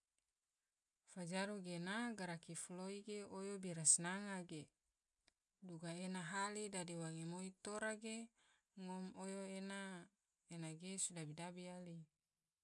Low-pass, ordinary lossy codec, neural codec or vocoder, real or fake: 10.8 kHz; none; none; real